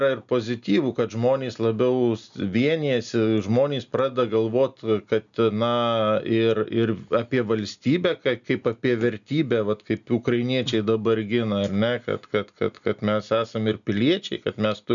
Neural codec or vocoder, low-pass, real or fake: none; 7.2 kHz; real